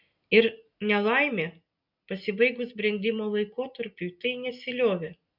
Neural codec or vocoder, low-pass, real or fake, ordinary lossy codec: none; 5.4 kHz; real; MP3, 48 kbps